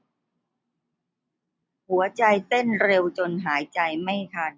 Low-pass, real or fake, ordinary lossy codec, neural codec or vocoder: none; real; none; none